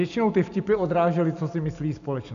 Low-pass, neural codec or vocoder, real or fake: 7.2 kHz; none; real